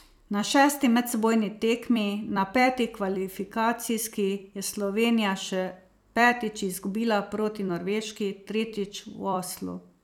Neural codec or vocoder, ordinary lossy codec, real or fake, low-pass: vocoder, 44.1 kHz, 128 mel bands every 256 samples, BigVGAN v2; none; fake; 19.8 kHz